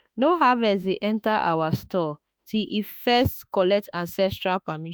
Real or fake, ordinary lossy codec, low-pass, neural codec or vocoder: fake; none; none; autoencoder, 48 kHz, 32 numbers a frame, DAC-VAE, trained on Japanese speech